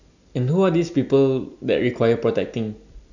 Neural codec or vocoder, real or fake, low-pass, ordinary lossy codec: none; real; 7.2 kHz; none